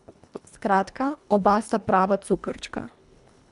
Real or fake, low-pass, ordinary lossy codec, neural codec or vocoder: fake; 10.8 kHz; none; codec, 24 kHz, 1.5 kbps, HILCodec